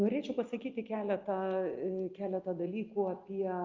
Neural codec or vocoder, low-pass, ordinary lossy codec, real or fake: none; 7.2 kHz; Opus, 32 kbps; real